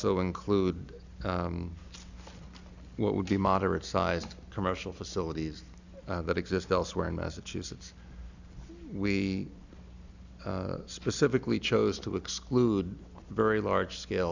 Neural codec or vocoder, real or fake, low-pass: none; real; 7.2 kHz